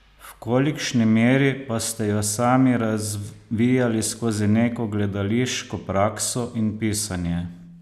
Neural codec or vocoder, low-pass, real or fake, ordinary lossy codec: none; 14.4 kHz; real; none